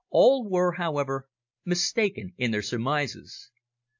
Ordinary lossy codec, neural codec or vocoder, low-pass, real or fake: AAC, 48 kbps; none; 7.2 kHz; real